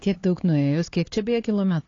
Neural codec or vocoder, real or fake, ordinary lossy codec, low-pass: codec, 16 kHz, 4 kbps, X-Codec, HuBERT features, trained on LibriSpeech; fake; AAC, 32 kbps; 7.2 kHz